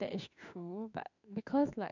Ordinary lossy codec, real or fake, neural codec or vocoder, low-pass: none; fake; autoencoder, 48 kHz, 32 numbers a frame, DAC-VAE, trained on Japanese speech; 7.2 kHz